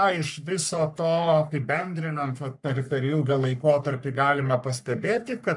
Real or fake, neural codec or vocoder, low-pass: fake; codec, 44.1 kHz, 3.4 kbps, Pupu-Codec; 10.8 kHz